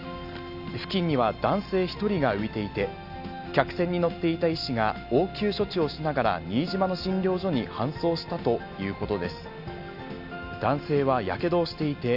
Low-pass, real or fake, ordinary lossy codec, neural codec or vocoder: 5.4 kHz; real; none; none